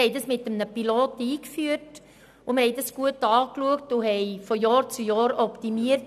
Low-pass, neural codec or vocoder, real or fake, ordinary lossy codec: 14.4 kHz; none; real; none